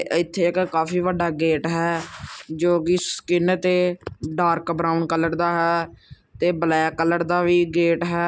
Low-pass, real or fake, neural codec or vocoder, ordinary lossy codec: none; real; none; none